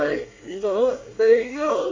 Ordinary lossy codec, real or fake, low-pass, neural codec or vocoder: AAC, 32 kbps; fake; 7.2 kHz; codec, 24 kHz, 1 kbps, SNAC